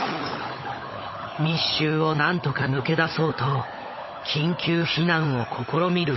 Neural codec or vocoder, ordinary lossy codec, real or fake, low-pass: codec, 16 kHz, 16 kbps, FunCodec, trained on LibriTTS, 50 frames a second; MP3, 24 kbps; fake; 7.2 kHz